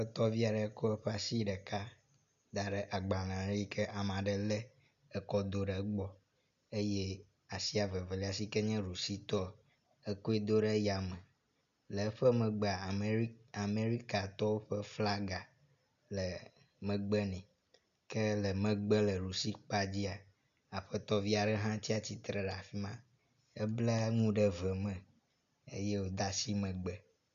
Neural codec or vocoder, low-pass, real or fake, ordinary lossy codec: none; 7.2 kHz; real; AAC, 96 kbps